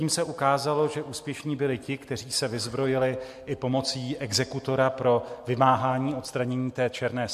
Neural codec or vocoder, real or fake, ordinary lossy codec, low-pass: none; real; MP3, 64 kbps; 14.4 kHz